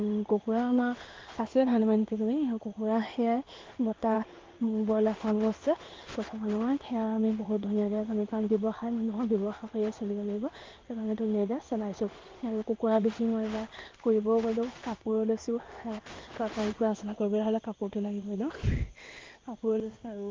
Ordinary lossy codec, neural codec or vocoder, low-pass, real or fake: Opus, 24 kbps; codec, 16 kHz in and 24 kHz out, 1 kbps, XY-Tokenizer; 7.2 kHz; fake